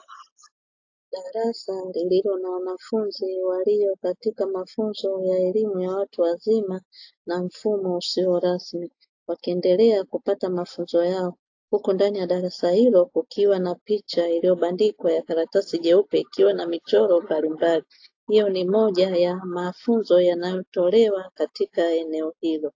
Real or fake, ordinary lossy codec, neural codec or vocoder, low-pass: real; AAC, 48 kbps; none; 7.2 kHz